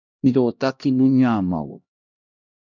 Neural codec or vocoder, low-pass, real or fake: codec, 16 kHz, 1 kbps, X-Codec, HuBERT features, trained on LibriSpeech; 7.2 kHz; fake